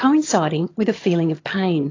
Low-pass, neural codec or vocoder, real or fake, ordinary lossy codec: 7.2 kHz; vocoder, 22.05 kHz, 80 mel bands, HiFi-GAN; fake; AAC, 32 kbps